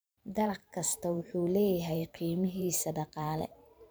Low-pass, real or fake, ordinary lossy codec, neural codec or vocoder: none; fake; none; vocoder, 44.1 kHz, 128 mel bands every 512 samples, BigVGAN v2